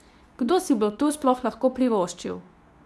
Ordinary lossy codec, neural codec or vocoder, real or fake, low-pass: none; codec, 24 kHz, 0.9 kbps, WavTokenizer, medium speech release version 2; fake; none